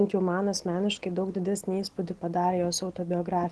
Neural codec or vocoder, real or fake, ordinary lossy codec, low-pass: none; real; Opus, 16 kbps; 10.8 kHz